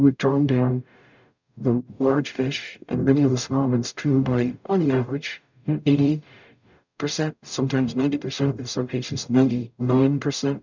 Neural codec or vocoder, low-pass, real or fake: codec, 44.1 kHz, 0.9 kbps, DAC; 7.2 kHz; fake